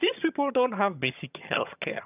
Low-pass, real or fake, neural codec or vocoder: 3.6 kHz; fake; vocoder, 22.05 kHz, 80 mel bands, HiFi-GAN